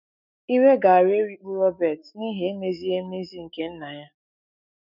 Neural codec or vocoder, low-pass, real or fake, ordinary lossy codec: autoencoder, 48 kHz, 128 numbers a frame, DAC-VAE, trained on Japanese speech; 5.4 kHz; fake; none